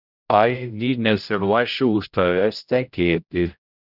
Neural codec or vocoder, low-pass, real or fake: codec, 16 kHz, 0.5 kbps, X-Codec, HuBERT features, trained on general audio; 5.4 kHz; fake